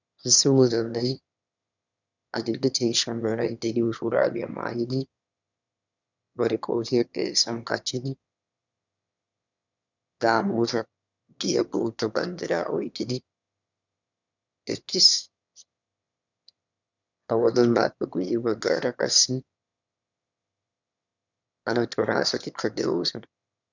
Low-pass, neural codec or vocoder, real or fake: 7.2 kHz; autoencoder, 22.05 kHz, a latent of 192 numbers a frame, VITS, trained on one speaker; fake